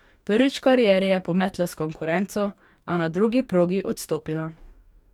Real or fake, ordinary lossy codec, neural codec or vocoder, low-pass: fake; none; codec, 44.1 kHz, 2.6 kbps, DAC; 19.8 kHz